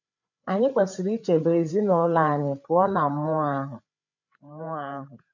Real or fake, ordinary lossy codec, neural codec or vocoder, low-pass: fake; none; codec, 16 kHz, 8 kbps, FreqCodec, larger model; 7.2 kHz